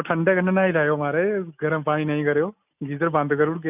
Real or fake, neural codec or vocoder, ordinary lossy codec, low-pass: real; none; none; 3.6 kHz